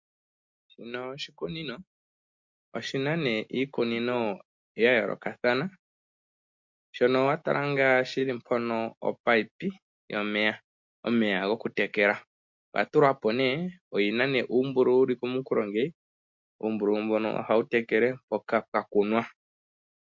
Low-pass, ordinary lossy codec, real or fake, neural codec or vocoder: 7.2 kHz; MP3, 48 kbps; real; none